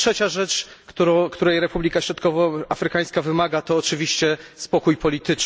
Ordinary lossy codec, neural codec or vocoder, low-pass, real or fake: none; none; none; real